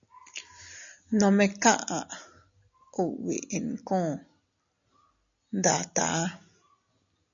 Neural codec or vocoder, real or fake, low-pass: none; real; 7.2 kHz